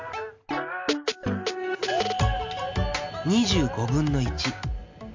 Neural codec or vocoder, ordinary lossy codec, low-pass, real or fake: none; MP3, 48 kbps; 7.2 kHz; real